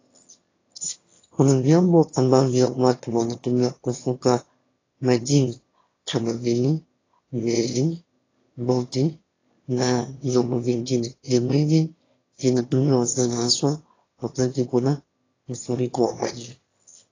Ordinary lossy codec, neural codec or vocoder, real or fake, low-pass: AAC, 32 kbps; autoencoder, 22.05 kHz, a latent of 192 numbers a frame, VITS, trained on one speaker; fake; 7.2 kHz